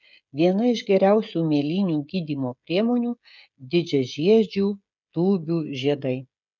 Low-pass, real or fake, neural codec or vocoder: 7.2 kHz; fake; codec, 16 kHz, 16 kbps, FreqCodec, smaller model